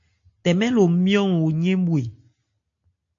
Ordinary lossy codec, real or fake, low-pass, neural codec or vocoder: AAC, 48 kbps; real; 7.2 kHz; none